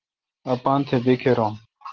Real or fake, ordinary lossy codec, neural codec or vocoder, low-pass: real; Opus, 16 kbps; none; 7.2 kHz